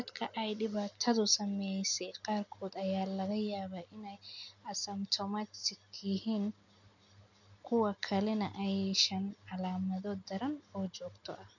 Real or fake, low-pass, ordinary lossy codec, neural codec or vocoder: real; 7.2 kHz; none; none